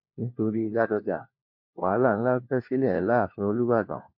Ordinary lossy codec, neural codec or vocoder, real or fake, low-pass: MP3, 32 kbps; codec, 16 kHz, 1 kbps, FunCodec, trained on LibriTTS, 50 frames a second; fake; 5.4 kHz